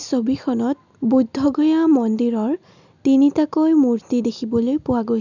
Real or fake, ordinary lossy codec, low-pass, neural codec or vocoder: real; none; 7.2 kHz; none